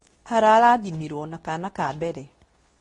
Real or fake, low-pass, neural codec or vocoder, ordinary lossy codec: fake; 10.8 kHz; codec, 24 kHz, 0.9 kbps, WavTokenizer, medium speech release version 2; AAC, 32 kbps